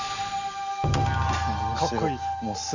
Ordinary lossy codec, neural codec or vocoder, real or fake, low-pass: none; none; real; 7.2 kHz